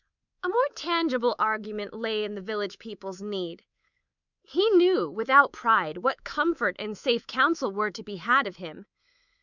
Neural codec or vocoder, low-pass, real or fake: codec, 24 kHz, 3.1 kbps, DualCodec; 7.2 kHz; fake